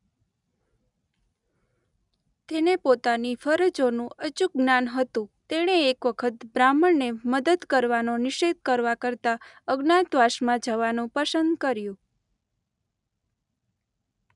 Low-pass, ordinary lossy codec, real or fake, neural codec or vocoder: 10.8 kHz; none; real; none